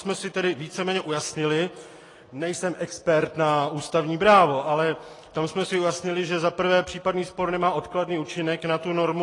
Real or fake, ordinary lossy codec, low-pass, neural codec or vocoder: real; AAC, 32 kbps; 10.8 kHz; none